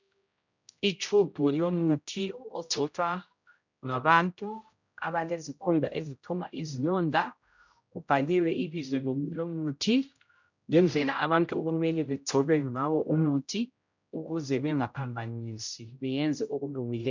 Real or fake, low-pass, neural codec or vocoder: fake; 7.2 kHz; codec, 16 kHz, 0.5 kbps, X-Codec, HuBERT features, trained on general audio